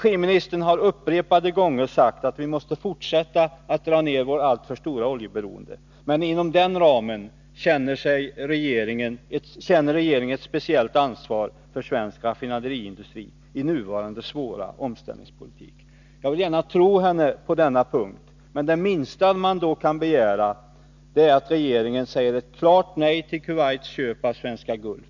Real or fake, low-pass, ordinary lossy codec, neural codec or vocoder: real; 7.2 kHz; none; none